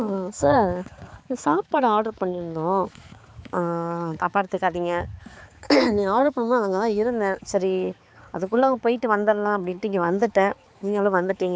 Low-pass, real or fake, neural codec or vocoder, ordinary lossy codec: none; fake; codec, 16 kHz, 4 kbps, X-Codec, HuBERT features, trained on balanced general audio; none